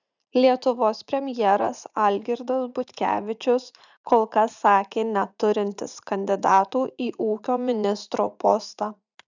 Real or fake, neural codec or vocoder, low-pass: fake; vocoder, 44.1 kHz, 80 mel bands, Vocos; 7.2 kHz